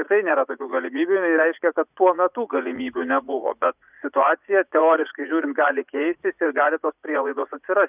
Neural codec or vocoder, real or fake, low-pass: vocoder, 44.1 kHz, 80 mel bands, Vocos; fake; 3.6 kHz